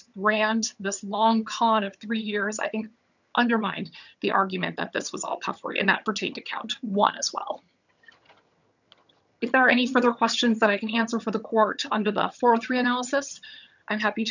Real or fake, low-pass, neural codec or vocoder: fake; 7.2 kHz; vocoder, 22.05 kHz, 80 mel bands, HiFi-GAN